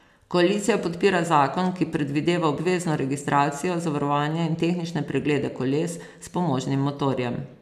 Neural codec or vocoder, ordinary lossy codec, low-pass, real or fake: none; none; 14.4 kHz; real